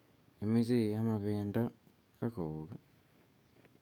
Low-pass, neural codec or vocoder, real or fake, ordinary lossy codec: 19.8 kHz; codec, 44.1 kHz, 7.8 kbps, DAC; fake; none